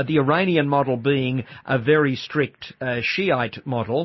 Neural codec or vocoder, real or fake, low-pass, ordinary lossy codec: none; real; 7.2 kHz; MP3, 24 kbps